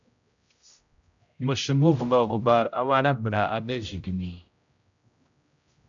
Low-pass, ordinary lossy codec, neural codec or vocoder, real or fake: 7.2 kHz; AAC, 64 kbps; codec, 16 kHz, 0.5 kbps, X-Codec, HuBERT features, trained on general audio; fake